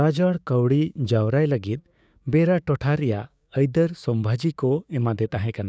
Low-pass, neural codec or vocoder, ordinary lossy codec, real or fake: none; codec, 16 kHz, 6 kbps, DAC; none; fake